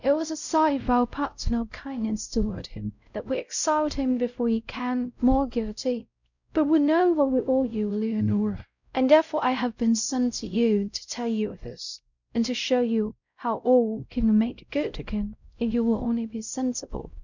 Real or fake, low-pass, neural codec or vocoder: fake; 7.2 kHz; codec, 16 kHz, 0.5 kbps, X-Codec, WavLM features, trained on Multilingual LibriSpeech